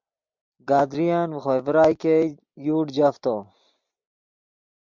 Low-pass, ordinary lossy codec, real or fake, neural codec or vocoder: 7.2 kHz; MP3, 64 kbps; real; none